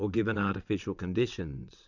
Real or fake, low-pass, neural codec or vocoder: fake; 7.2 kHz; vocoder, 22.05 kHz, 80 mel bands, WaveNeXt